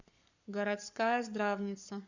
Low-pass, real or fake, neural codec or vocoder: 7.2 kHz; fake; codec, 44.1 kHz, 7.8 kbps, Pupu-Codec